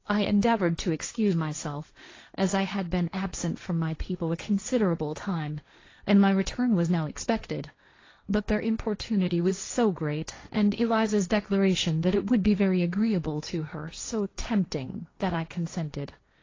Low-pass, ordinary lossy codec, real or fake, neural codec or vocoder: 7.2 kHz; AAC, 32 kbps; fake; codec, 16 kHz, 1.1 kbps, Voila-Tokenizer